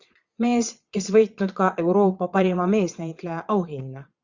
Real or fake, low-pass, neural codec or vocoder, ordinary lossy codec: fake; 7.2 kHz; vocoder, 22.05 kHz, 80 mel bands, Vocos; Opus, 64 kbps